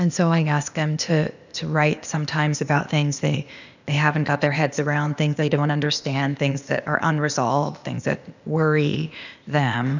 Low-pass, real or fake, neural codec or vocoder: 7.2 kHz; fake; codec, 16 kHz, 0.8 kbps, ZipCodec